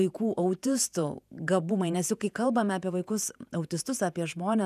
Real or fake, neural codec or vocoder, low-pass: fake; vocoder, 48 kHz, 128 mel bands, Vocos; 14.4 kHz